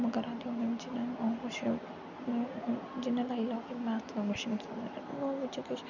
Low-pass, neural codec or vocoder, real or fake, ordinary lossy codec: 7.2 kHz; none; real; none